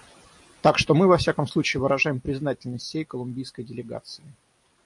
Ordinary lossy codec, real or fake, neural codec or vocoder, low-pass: AAC, 64 kbps; real; none; 10.8 kHz